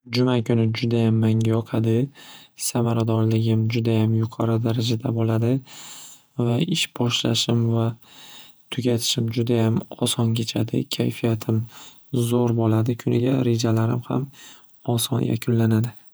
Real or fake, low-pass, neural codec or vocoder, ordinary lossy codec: fake; none; vocoder, 48 kHz, 128 mel bands, Vocos; none